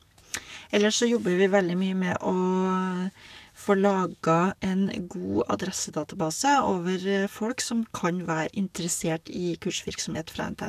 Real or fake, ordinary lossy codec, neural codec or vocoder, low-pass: fake; none; codec, 44.1 kHz, 7.8 kbps, DAC; 14.4 kHz